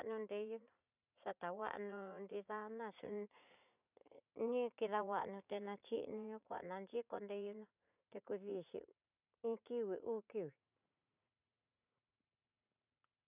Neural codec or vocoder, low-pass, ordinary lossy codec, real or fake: vocoder, 44.1 kHz, 80 mel bands, Vocos; 3.6 kHz; none; fake